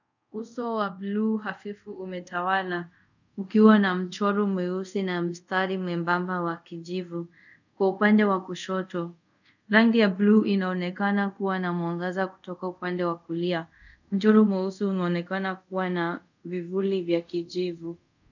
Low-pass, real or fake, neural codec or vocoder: 7.2 kHz; fake; codec, 24 kHz, 0.5 kbps, DualCodec